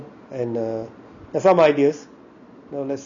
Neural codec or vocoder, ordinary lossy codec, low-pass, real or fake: none; none; 7.2 kHz; real